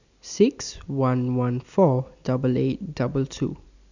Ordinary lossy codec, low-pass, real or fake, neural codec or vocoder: none; 7.2 kHz; real; none